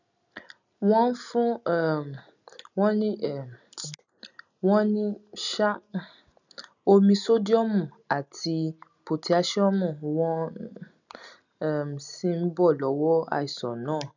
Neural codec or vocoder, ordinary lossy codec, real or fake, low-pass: none; none; real; 7.2 kHz